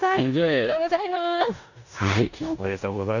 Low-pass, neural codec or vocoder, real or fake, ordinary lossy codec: 7.2 kHz; codec, 16 kHz in and 24 kHz out, 0.9 kbps, LongCat-Audio-Codec, four codebook decoder; fake; none